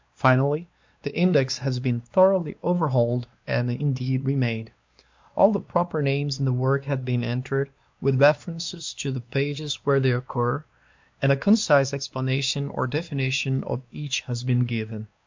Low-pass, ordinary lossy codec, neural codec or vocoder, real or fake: 7.2 kHz; MP3, 64 kbps; codec, 16 kHz, 2 kbps, X-Codec, WavLM features, trained on Multilingual LibriSpeech; fake